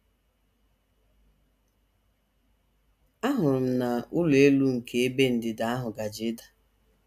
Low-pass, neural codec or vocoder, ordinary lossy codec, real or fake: 14.4 kHz; none; none; real